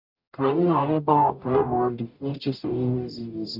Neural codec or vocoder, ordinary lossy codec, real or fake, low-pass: codec, 44.1 kHz, 0.9 kbps, DAC; MP3, 48 kbps; fake; 5.4 kHz